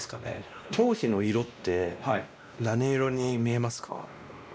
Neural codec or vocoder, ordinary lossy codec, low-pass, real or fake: codec, 16 kHz, 1 kbps, X-Codec, WavLM features, trained on Multilingual LibriSpeech; none; none; fake